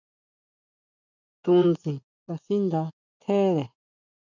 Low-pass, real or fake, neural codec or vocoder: 7.2 kHz; fake; vocoder, 24 kHz, 100 mel bands, Vocos